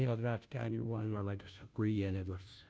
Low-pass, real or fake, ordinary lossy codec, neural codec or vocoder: none; fake; none; codec, 16 kHz, 0.5 kbps, FunCodec, trained on Chinese and English, 25 frames a second